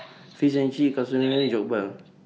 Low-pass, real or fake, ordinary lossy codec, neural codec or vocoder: none; real; none; none